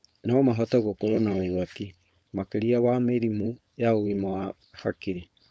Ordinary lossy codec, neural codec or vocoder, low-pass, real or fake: none; codec, 16 kHz, 4.8 kbps, FACodec; none; fake